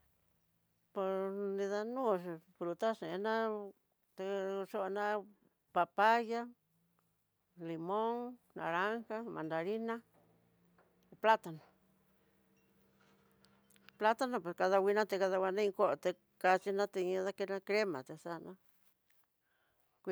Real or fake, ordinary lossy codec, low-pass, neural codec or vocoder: real; none; none; none